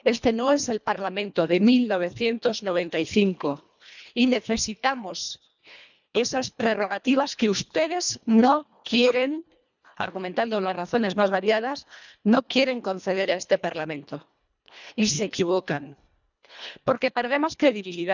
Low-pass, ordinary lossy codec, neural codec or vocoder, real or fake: 7.2 kHz; none; codec, 24 kHz, 1.5 kbps, HILCodec; fake